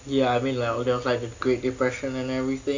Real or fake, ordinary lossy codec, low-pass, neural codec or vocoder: real; AAC, 48 kbps; 7.2 kHz; none